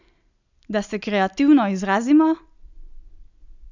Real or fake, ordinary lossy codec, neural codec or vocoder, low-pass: real; none; none; 7.2 kHz